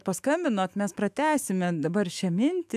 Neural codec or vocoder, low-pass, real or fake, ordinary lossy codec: autoencoder, 48 kHz, 128 numbers a frame, DAC-VAE, trained on Japanese speech; 14.4 kHz; fake; AAC, 96 kbps